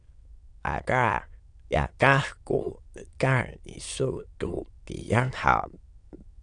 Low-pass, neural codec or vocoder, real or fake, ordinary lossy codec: 9.9 kHz; autoencoder, 22.05 kHz, a latent of 192 numbers a frame, VITS, trained on many speakers; fake; AAC, 64 kbps